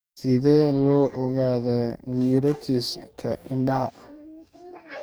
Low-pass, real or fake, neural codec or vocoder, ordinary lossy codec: none; fake; codec, 44.1 kHz, 2.6 kbps, DAC; none